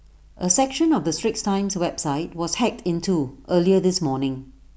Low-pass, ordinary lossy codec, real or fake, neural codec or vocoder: none; none; real; none